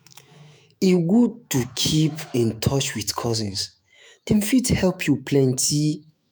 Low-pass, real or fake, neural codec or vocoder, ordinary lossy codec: none; fake; autoencoder, 48 kHz, 128 numbers a frame, DAC-VAE, trained on Japanese speech; none